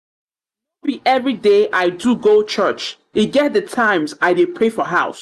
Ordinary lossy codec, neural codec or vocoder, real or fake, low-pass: Opus, 64 kbps; none; real; 14.4 kHz